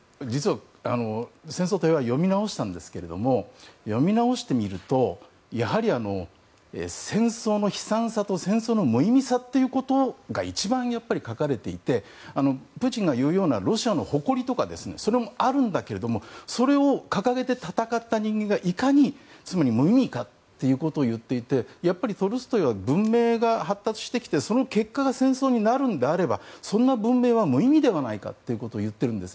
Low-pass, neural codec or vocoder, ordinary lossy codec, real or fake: none; none; none; real